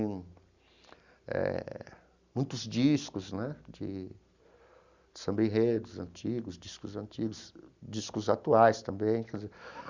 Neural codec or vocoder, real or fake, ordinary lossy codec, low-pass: none; real; Opus, 64 kbps; 7.2 kHz